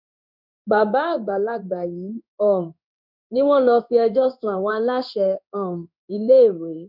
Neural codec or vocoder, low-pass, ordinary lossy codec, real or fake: codec, 16 kHz in and 24 kHz out, 1 kbps, XY-Tokenizer; 5.4 kHz; none; fake